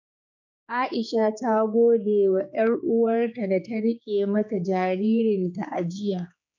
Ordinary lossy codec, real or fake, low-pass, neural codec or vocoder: none; fake; 7.2 kHz; codec, 16 kHz, 4 kbps, X-Codec, HuBERT features, trained on general audio